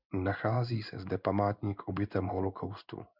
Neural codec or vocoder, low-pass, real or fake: codec, 16 kHz in and 24 kHz out, 1 kbps, XY-Tokenizer; 5.4 kHz; fake